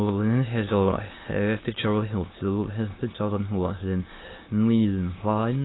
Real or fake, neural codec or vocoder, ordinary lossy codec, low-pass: fake; autoencoder, 22.05 kHz, a latent of 192 numbers a frame, VITS, trained on many speakers; AAC, 16 kbps; 7.2 kHz